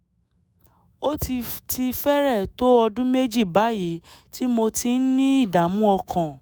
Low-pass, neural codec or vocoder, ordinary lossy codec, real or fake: none; autoencoder, 48 kHz, 128 numbers a frame, DAC-VAE, trained on Japanese speech; none; fake